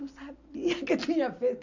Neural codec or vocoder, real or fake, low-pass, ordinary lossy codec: none; real; 7.2 kHz; MP3, 64 kbps